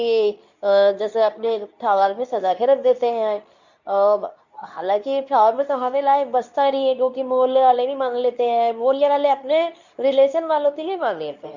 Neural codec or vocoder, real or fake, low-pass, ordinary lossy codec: codec, 24 kHz, 0.9 kbps, WavTokenizer, medium speech release version 2; fake; 7.2 kHz; none